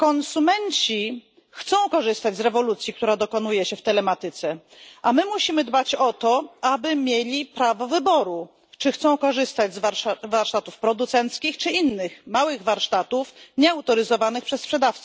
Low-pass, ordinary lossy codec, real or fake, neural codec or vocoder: none; none; real; none